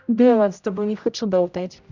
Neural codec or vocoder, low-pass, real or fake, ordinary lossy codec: codec, 16 kHz, 0.5 kbps, X-Codec, HuBERT features, trained on general audio; 7.2 kHz; fake; none